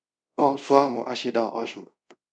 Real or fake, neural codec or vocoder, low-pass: fake; codec, 24 kHz, 0.5 kbps, DualCodec; 9.9 kHz